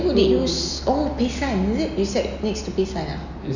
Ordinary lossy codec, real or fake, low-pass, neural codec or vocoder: none; real; 7.2 kHz; none